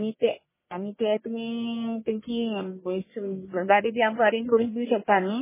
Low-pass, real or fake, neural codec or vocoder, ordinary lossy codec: 3.6 kHz; fake; codec, 44.1 kHz, 1.7 kbps, Pupu-Codec; MP3, 16 kbps